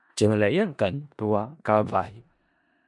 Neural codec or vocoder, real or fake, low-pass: codec, 16 kHz in and 24 kHz out, 0.4 kbps, LongCat-Audio-Codec, four codebook decoder; fake; 10.8 kHz